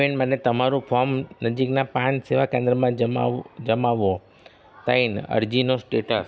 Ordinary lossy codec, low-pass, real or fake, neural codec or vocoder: none; none; real; none